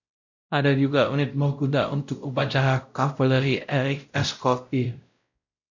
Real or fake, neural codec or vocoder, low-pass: fake; codec, 16 kHz, 0.5 kbps, X-Codec, WavLM features, trained on Multilingual LibriSpeech; 7.2 kHz